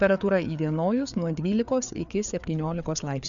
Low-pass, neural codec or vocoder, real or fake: 7.2 kHz; codec, 16 kHz, 4 kbps, FreqCodec, larger model; fake